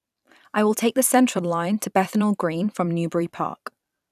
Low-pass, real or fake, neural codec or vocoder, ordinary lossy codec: 14.4 kHz; fake; vocoder, 44.1 kHz, 128 mel bands every 256 samples, BigVGAN v2; none